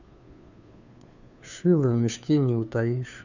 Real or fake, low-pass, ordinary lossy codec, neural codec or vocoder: fake; 7.2 kHz; none; codec, 16 kHz, 2 kbps, FunCodec, trained on Chinese and English, 25 frames a second